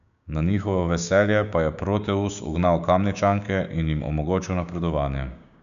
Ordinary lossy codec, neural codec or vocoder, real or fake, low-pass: none; codec, 16 kHz, 6 kbps, DAC; fake; 7.2 kHz